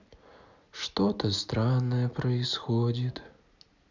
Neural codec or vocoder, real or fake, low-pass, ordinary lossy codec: none; real; 7.2 kHz; none